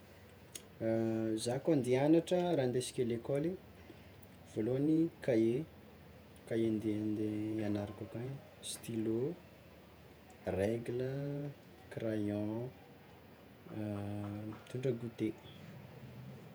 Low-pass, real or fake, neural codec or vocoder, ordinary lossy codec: none; fake; vocoder, 48 kHz, 128 mel bands, Vocos; none